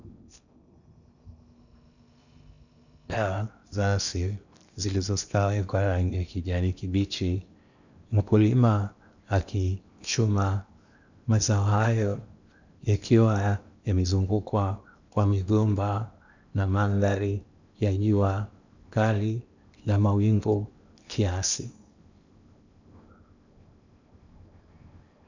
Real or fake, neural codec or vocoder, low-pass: fake; codec, 16 kHz in and 24 kHz out, 0.8 kbps, FocalCodec, streaming, 65536 codes; 7.2 kHz